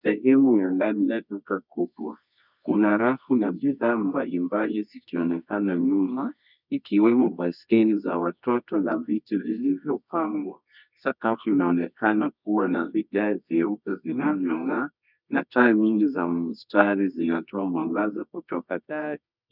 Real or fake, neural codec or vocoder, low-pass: fake; codec, 24 kHz, 0.9 kbps, WavTokenizer, medium music audio release; 5.4 kHz